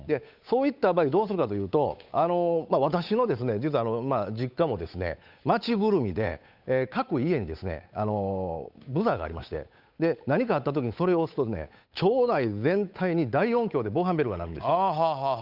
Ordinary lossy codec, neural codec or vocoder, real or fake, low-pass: none; codec, 16 kHz, 8 kbps, FunCodec, trained on Chinese and English, 25 frames a second; fake; 5.4 kHz